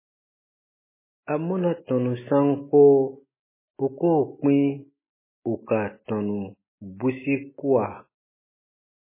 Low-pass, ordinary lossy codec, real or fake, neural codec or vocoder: 3.6 kHz; MP3, 16 kbps; real; none